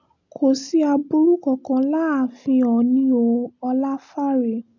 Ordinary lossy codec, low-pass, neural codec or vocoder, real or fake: none; 7.2 kHz; none; real